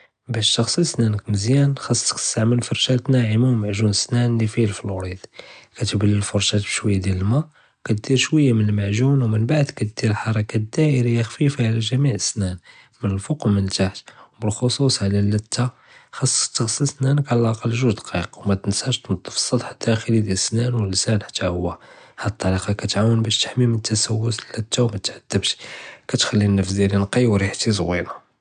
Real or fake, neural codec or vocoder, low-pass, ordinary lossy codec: real; none; 9.9 kHz; none